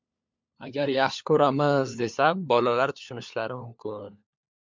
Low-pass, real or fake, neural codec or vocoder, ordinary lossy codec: 7.2 kHz; fake; codec, 16 kHz, 4 kbps, FunCodec, trained on LibriTTS, 50 frames a second; MP3, 64 kbps